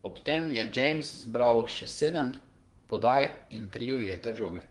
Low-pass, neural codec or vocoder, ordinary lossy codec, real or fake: 10.8 kHz; codec, 24 kHz, 1 kbps, SNAC; Opus, 24 kbps; fake